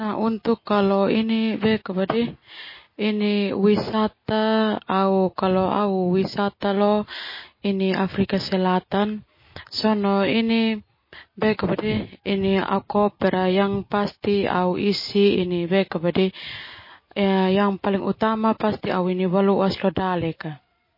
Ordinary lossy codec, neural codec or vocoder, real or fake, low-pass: MP3, 24 kbps; none; real; 5.4 kHz